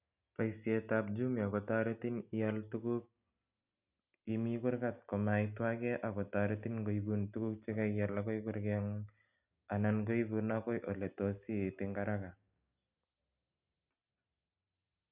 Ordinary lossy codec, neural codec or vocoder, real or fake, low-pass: none; none; real; 3.6 kHz